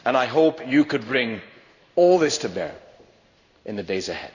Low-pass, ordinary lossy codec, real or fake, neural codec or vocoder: 7.2 kHz; none; fake; codec, 16 kHz in and 24 kHz out, 1 kbps, XY-Tokenizer